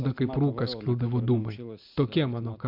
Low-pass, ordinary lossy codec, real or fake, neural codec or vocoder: 5.4 kHz; MP3, 48 kbps; real; none